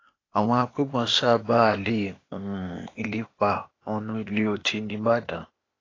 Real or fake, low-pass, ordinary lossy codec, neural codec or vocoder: fake; 7.2 kHz; AAC, 32 kbps; codec, 16 kHz, 0.8 kbps, ZipCodec